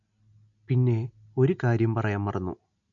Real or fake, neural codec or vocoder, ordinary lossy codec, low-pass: real; none; none; 7.2 kHz